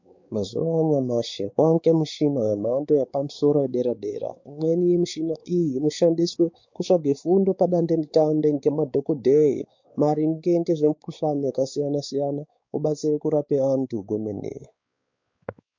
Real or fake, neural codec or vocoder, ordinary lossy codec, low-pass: fake; codec, 16 kHz, 4 kbps, X-Codec, WavLM features, trained on Multilingual LibriSpeech; MP3, 48 kbps; 7.2 kHz